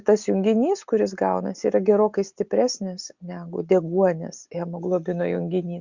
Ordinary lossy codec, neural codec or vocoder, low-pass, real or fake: Opus, 64 kbps; none; 7.2 kHz; real